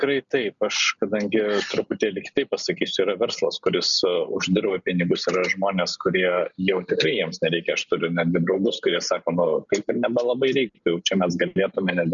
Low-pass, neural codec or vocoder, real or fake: 7.2 kHz; none; real